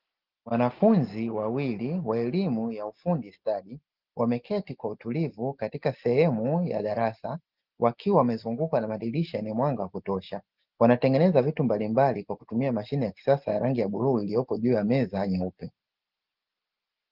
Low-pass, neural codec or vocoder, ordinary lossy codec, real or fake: 5.4 kHz; none; Opus, 32 kbps; real